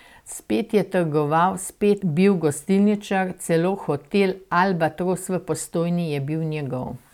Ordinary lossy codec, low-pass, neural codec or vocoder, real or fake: none; 19.8 kHz; none; real